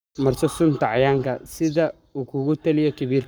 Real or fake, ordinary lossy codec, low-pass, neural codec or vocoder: fake; none; none; codec, 44.1 kHz, 7.8 kbps, Pupu-Codec